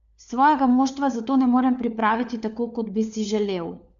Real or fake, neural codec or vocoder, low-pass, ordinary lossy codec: fake; codec, 16 kHz, 4 kbps, FunCodec, trained on LibriTTS, 50 frames a second; 7.2 kHz; none